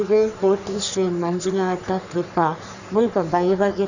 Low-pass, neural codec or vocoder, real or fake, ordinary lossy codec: 7.2 kHz; codec, 44.1 kHz, 3.4 kbps, Pupu-Codec; fake; none